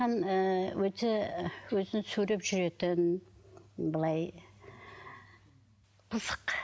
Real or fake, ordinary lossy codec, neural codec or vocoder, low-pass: real; none; none; none